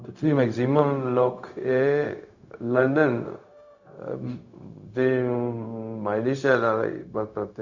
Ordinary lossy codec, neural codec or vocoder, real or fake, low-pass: Opus, 64 kbps; codec, 16 kHz, 0.4 kbps, LongCat-Audio-Codec; fake; 7.2 kHz